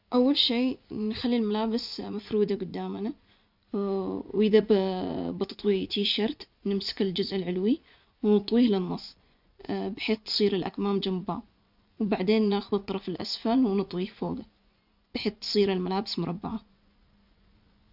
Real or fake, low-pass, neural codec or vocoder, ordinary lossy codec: fake; 5.4 kHz; vocoder, 24 kHz, 100 mel bands, Vocos; none